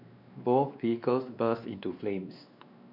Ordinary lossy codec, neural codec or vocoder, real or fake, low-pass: none; codec, 16 kHz, 2 kbps, X-Codec, WavLM features, trained on Multilingual LibriSpeech; fake; 5.4 kHz